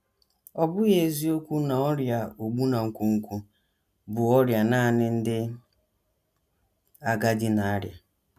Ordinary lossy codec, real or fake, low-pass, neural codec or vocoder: none; real; 14.4 kHz; none